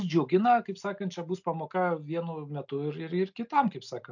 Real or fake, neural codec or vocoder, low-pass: real; none; 7.2 kHz